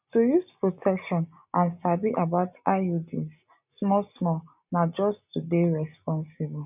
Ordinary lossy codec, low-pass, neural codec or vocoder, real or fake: none; 3.6 kHz; none; real